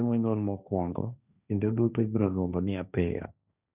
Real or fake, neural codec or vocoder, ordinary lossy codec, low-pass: fake; codec, 16 kHz, 1.1 kbps, Voila-Tokenizer; none; 3.6 kHz